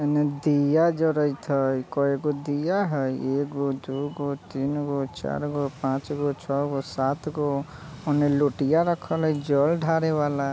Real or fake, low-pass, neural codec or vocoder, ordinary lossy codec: real; none; none; none